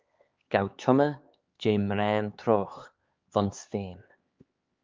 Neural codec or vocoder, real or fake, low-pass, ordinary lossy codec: codec, 16 kHz, 4 kbps, X-Codec, HuBERT features, trained on LibriSpeech; fake; 7.2 kHz; Opus, 24 kbps